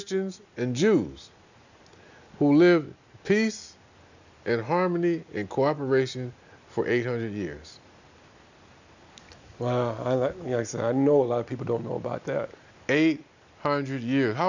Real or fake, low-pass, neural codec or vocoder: real; 7.2 kHz; none